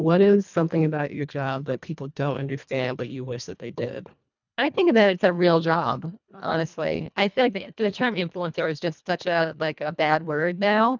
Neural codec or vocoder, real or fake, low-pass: codec, 24 kHz, 1.5 kbps, HILCodec; fake; 7.2 kHz